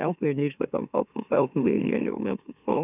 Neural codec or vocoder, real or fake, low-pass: autoencoder, 44.1 kHz, a latent of 192 numbers a frame, MeloTTS; fake; 3.6 kHz